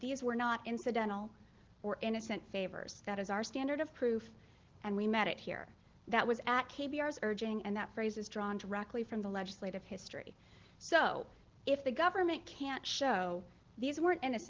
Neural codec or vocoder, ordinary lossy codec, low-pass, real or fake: none; Opus, 16 kbps; 7.2 kHz; real